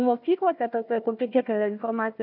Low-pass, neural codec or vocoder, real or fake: 5.4 kHz; codec, 16 kHz, 1 kbps, FunCodec, trained on Chinese and English, 50 frames a second; fake